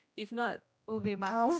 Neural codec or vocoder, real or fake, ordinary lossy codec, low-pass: codec, 16 kHz, 1 kbps, X-Codec, HuBERT features, trained on general audio; fake; none; none